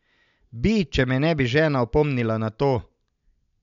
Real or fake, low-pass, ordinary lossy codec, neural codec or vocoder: real; 7.2 kHz; none; none